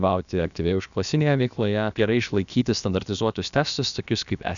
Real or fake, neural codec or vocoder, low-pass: fake; codec, 16 kHz, about 1 kbps, DyCAST, with the encoder's durations; 7.2 kHz